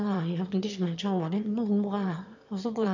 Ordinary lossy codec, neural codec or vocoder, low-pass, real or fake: none; autoencoder, 22.05 kHz, a latent of 192 numbers a frame, VITS, trained on one speaker; 7.2 kHz; fake